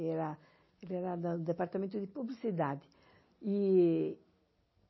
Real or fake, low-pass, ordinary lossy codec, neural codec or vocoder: real; 7.2 kHz; MP3, 24 kbps; none